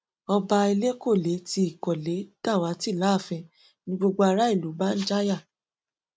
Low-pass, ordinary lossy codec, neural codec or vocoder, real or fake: none; none; none; real